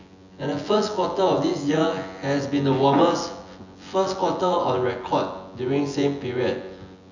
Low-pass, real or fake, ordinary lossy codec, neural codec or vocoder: 7.2 kHz; fake; none; vocoder, 24 kHz, 100 mel bands, Vocos